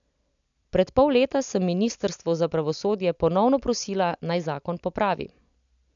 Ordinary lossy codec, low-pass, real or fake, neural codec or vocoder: none; 7.2 kHz; real; none